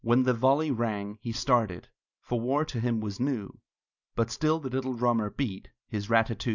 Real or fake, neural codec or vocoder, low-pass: real; none; 7.2 kHz